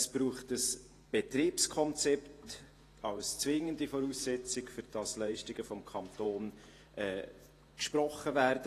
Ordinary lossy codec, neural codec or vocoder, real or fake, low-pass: AAC, 48 kbps; vocoder, 48 kHz, 128 mel bands, Vocos; fake; 14.4 kHz